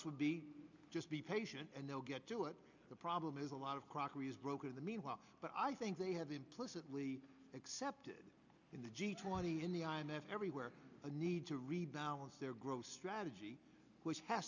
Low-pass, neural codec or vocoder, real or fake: 7.2 kHz; none; real